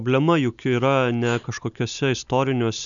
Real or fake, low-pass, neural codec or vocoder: real; 7.2 kHz; none